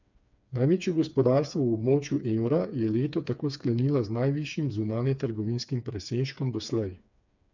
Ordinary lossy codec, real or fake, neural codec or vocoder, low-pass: none; fake; codec, 16 kHz, 4 kbps, FreqCodec, smaller model; 7.2 kHz